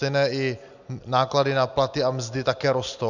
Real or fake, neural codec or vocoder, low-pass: real; none; 7.2 kHz